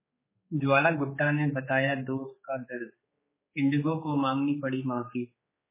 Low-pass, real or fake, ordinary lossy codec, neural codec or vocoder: 3.6 kHz; fake; MP3, 16 kbps; codec, 16 kHz, 4 kbps, X-Codec, HuBERT features, trained on general audio